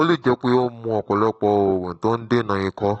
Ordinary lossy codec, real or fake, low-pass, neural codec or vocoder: AAC, 24 kbps; fake; 19.8 kHz; autoencoder, 48 kHz, 128 numbers a frame, DAC-VAE, trained on Japanese speech